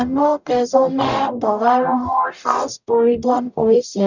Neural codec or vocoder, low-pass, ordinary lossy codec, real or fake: codec, 44.1 kHz, 0.9 kbps, DAC; 7.2 kHz; none; fake